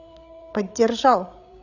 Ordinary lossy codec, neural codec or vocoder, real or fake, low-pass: none; codec, 16 kHz, 16 kbps, FreqCodec, larger model; fake; 7.2 kHz